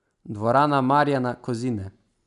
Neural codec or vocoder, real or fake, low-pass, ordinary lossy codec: none; real; 10.8 kHz; none